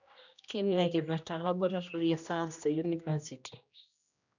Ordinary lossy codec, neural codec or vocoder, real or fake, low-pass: none; codec, 16 kHz, 1 kbps, X-Codec, HuBERT features, trained on general audio; fake; 7.2 kHz